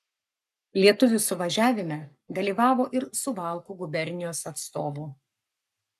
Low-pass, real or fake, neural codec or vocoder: 14.4 kHz; fake; codec, 44.1 kHz, 7.8 kbps, Pupu-Codec